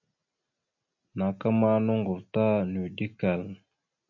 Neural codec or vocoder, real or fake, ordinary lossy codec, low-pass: none; real; MP3, 64 kbps; 7.2 kHz